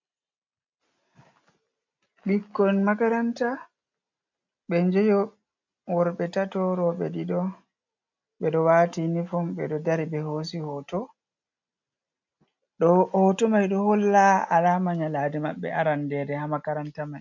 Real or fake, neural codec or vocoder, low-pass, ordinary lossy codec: real; none; 7.2 kHz; MP3, 64 kbps